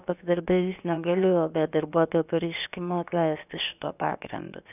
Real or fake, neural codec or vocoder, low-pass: fake; codec, 16 kHz, about 1 kbps, DyCAST, with the encoder's durations; 3.6 kHz